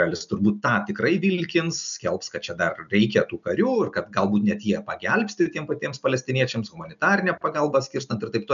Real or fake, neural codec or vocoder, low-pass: real; none; 7.2 kHz